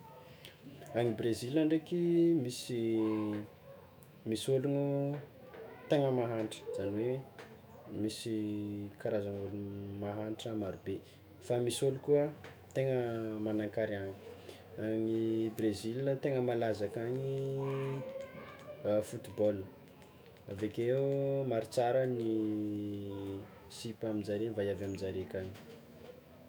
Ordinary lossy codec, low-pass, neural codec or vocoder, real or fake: none; none; autoencoder, 48 kHz, 128 numbers a frame, DAC-VAE, trained on Japanese speech; fake